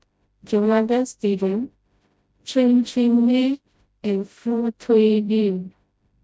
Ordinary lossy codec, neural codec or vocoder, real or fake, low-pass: none; codec, 16 kHz, 0.5 kbps, FreqCodec, smaller model; fake; none